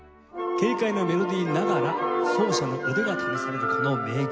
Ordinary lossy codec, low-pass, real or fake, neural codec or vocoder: none; none; real; none